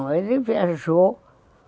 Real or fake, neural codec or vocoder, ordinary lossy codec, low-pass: real; none; none; none